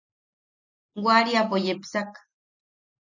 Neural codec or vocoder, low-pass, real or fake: none; 7.2 kHz; real